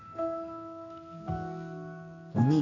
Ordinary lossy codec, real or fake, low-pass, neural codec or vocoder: none; fake; 7.2 kHz; codec, 44.1 kHz, 2.6 kbps, SNAC